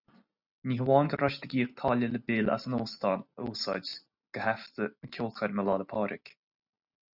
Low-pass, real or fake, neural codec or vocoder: 5.4 kHz; real; none